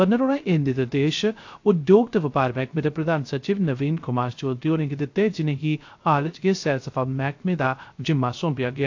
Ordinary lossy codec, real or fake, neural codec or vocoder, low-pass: AAC, 48 kbps; fake; codec, 16 kHz, 0.3 kbps, FocalCodec; 7.2 kHz